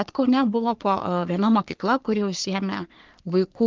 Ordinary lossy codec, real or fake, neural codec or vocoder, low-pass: Opus, 32 kbps; fake; codec, 44.1 kHz, 3.4 kbps, Pupu-Codec; 7.2 kHz